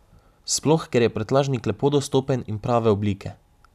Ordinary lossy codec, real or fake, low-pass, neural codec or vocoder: none; real; 14.4 kHz; none